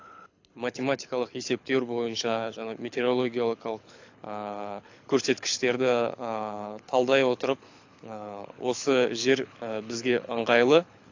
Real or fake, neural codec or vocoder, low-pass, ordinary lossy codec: fake; codec, 24 kHz, 6 kbps, HILCodec; 7.2 kHz; AAC, 48 kbps